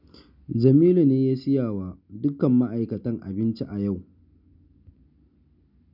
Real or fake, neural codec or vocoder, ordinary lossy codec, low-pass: real; none; none; 5.4 kHz